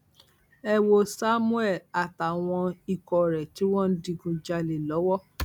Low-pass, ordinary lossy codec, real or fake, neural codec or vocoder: 19.8 kHz; none; real; none